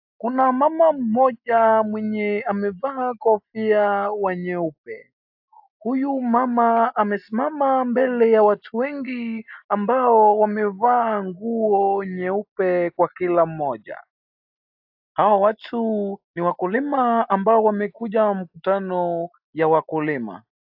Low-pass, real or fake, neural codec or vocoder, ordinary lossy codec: 5.4 kHz; real; none; AAC, 48 kbps